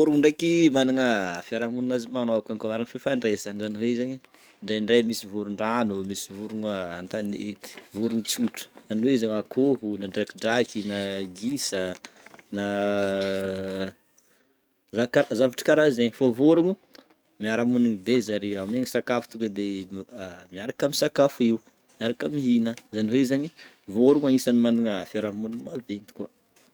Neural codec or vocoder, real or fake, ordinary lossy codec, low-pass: codec, 44.1 kHz, 7.8 kbps, DAC; fake; none; none